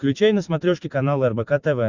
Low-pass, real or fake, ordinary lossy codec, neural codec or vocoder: 7.2 kHz; real; Opus, 64 kbps; none